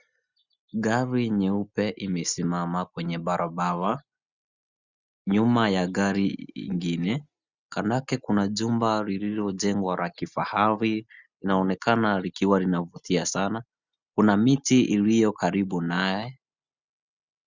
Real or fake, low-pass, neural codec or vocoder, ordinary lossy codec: real; 7.2 kHz; none; Opus, 64 kbps